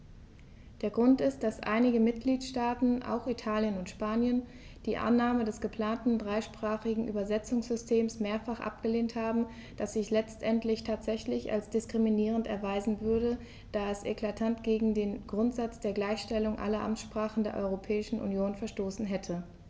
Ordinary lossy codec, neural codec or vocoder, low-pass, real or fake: none; none; none; real